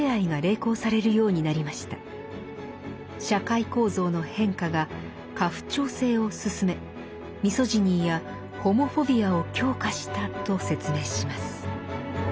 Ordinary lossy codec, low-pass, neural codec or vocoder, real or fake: none; none; none; real